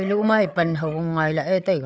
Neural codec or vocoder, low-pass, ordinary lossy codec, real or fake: codec, 16 kHz, 8 kbps, FreqCodec, larger model; none; none; fake